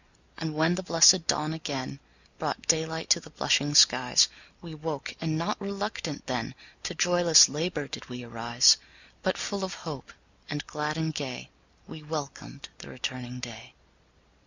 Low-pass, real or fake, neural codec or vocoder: 7.2 kHz; real; none